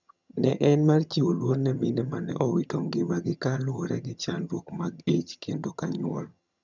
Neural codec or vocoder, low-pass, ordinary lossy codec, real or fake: vocoder, 22.05 kHz, 80 mel bands, HiFi-GAN; 7.2 kHz; none; fake